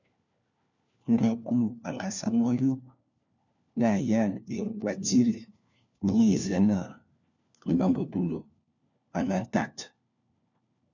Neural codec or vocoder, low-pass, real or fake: codec, 16 kHz, 1 kbps, FunCodec, trained on LibriTTS, 50 frames a second; 7.2 kHz; fake